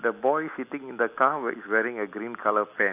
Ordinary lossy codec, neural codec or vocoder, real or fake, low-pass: AAC, 32 kbps; none; real; 3.6 kHz